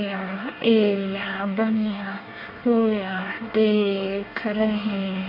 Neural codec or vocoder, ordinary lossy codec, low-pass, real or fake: codec, 24 kHz, 1 kbps, SNAC; MP3, 32 kbps; 5.4 kHz; fake